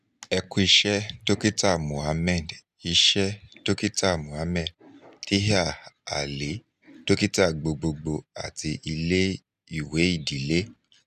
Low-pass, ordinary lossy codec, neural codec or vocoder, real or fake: 14.4 kHz; none; none; real